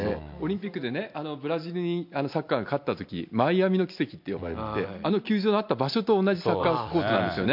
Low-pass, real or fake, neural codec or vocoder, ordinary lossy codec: 5.4 kHz; real; none; MP3, 48 kbps